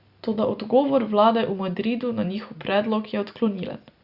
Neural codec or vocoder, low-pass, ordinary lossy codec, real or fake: none; 5.4 kHz; Opus, 64 kbps; real